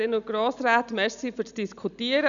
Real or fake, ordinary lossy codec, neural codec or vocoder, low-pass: real; none; none; 7.2 kHz